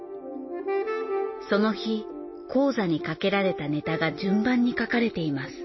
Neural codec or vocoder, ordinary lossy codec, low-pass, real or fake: none; MP3, 24 kbps; 7.2 kHz; real